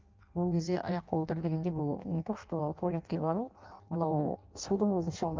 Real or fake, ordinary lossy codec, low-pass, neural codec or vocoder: fake; Opus, 32 kbps; 7.2 kHz; codec, 16 kHz in and 24 kHz out, 0.6 kbps, FireRedTTS-2 codec